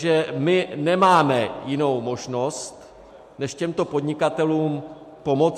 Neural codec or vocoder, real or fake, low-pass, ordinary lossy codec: none; real; 14.4 kHz; MP3, 64 kbps